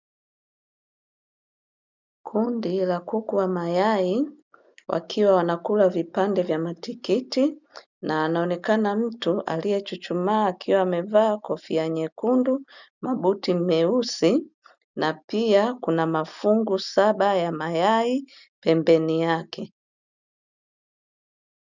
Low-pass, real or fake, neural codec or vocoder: 7.2 kHz; real; none